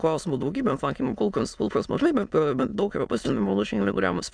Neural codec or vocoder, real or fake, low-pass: autoencoder, 22.05 kHz, a latent of 192 numbers a frame, VITS, trained on many speakers; fake; 9.9 kHz